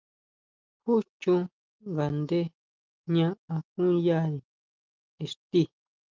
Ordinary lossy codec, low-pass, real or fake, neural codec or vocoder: Opus, 32 kbps; 7.2 kHz; real; none